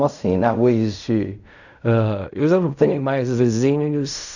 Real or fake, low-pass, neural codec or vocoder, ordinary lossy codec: fake; 7.2 kHz; codec, 16 kHz in and 24 kHz out, 0.4 kbps, LongCat-Audio-Codec, fine tuned four codebook decoder; none